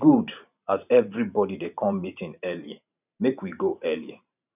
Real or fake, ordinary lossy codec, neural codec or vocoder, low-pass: fake; none; vocoder, 44.1 kHz, 128 mel bands every 512 samples, BigVGAN v2; 3.6 kHz